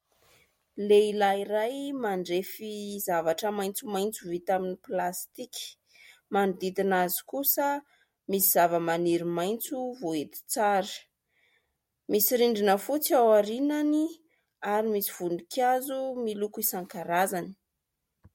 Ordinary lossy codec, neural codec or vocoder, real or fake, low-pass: MP3, 64 kbps; none; real; 19.8 kHz